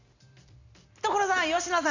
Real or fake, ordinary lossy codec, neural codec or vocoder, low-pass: real; Opus, 64 kbps; none; 7.2 kHz